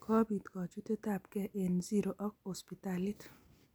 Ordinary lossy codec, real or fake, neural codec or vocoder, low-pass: none; real; none; none